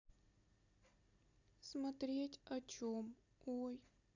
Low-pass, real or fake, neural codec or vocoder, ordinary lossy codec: 7.2 kHz; real; none; none